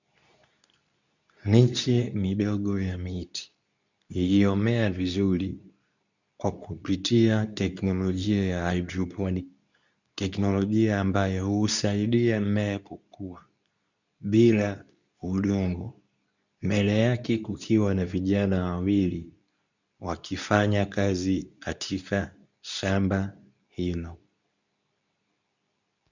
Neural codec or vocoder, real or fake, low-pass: codec, 24 kHz, 0.9 kbps, WavTokenizer, medium speech release version 2; fake; 7.2 kHz